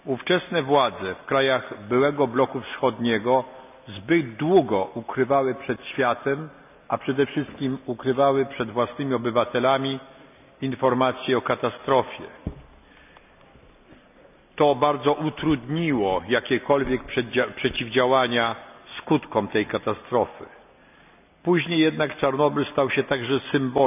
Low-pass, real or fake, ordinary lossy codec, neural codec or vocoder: 3.6 kHz; real; none; none